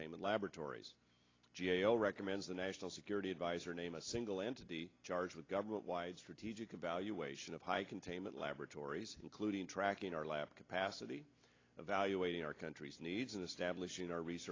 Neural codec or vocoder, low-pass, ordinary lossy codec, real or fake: none; 7.2 kHz; AAC, 32 kbps; real